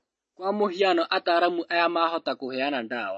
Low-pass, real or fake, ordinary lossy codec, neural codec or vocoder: 10.8 kHz; real; MP3, 32 kbps; none